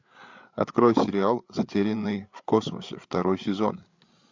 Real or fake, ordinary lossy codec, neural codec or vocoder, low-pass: fake; MP3, 64 kbps; codec, 16 kHz, 8 kbps, FreqCodec, larger model; 7.2 kHz